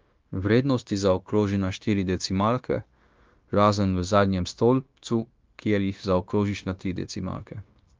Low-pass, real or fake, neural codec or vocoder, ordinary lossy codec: 7.2 kHz; fake; codec, 16 kHz, 0.9 kbps, LongCat-Audio-Codec; Opus, 32 kbps